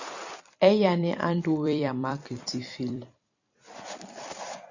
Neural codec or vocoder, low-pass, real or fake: vocoder, 44.1 kHz, 128 mel bands every 256 samples, BigVGAN v2; 7.2 kHz; fake